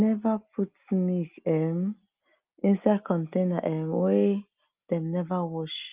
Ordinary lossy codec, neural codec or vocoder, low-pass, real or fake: Opus, 24 kbps; none; 3.6 kHz; real